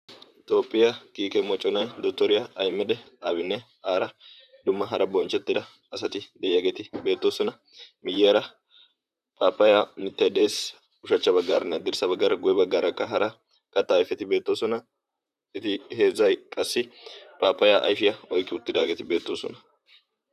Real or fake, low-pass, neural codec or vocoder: fake; 14.4 kHz; vocoder, 44.1 kHz, 128 mel bands, Pupu-Vocoder